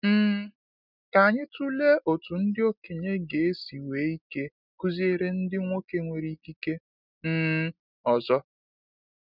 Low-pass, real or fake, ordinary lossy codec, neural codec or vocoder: 5.4 kHz; real; none; none